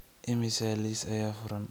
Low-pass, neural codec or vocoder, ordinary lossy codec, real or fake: none; none; none; real